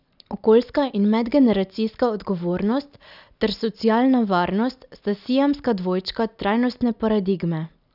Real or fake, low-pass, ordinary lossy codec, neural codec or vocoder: real; 5.4 kHz; none; none